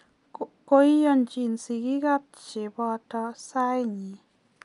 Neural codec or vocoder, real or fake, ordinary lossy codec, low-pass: none; real; none; 10.8 kHz